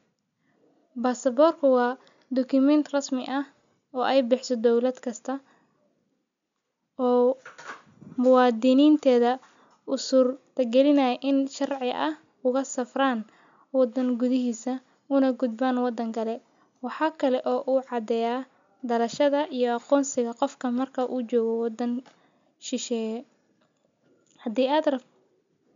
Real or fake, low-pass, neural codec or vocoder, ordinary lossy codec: real; 7.2 kHz; none; MP3, 64 kbps